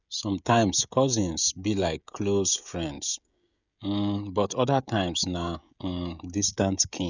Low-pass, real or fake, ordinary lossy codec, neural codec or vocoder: 7.2 kHz; fake; none; codec, 16 kHz, 16 kbps, FreqCodec, smaller model